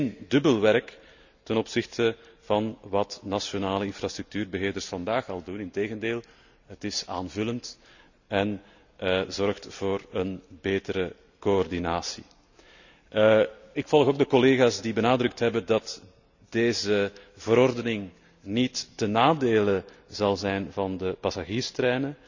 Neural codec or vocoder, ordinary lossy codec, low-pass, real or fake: none; none; 7.2 kHz; real